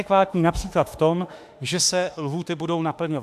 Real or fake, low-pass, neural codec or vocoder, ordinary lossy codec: fake; 14.4 kHz; autoencoder, 48 kHz, 32 numbers a frame, DAC-VAE, trained on Japanese speech; AAC, 96 kbps